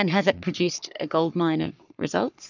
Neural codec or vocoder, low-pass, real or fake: codec, 44.1 kHz, 3.4 kbps, Pupu-Codec; 7.2 kHz; fake